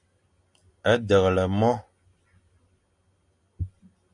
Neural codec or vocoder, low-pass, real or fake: none; 10.8 kHz; real